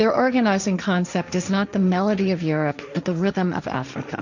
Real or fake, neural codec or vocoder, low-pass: fake; codec, 16 kHz, 1.1 kbps, Voila-Tokenizer; 7.2 kHz